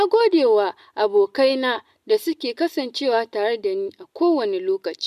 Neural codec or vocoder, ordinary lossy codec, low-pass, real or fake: none; none; 14.4 kHz; real